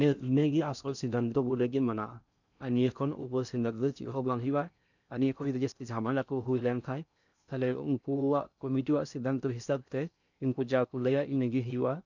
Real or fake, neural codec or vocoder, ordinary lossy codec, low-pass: fake; codec, 16 kHz in and 24 kHz out, 0.6 kbps, FocalCodec, streaming, 4096 codes; none; 7.2 kHz